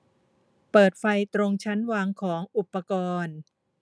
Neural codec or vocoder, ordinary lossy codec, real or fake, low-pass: none; none; real; none